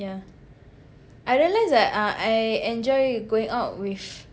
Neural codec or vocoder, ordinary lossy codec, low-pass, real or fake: none; none; none; real